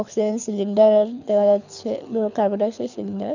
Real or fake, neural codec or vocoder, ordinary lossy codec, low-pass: fake; codec, 24 kHz, 6 kbps, HILCodec; none; 7.2 kHz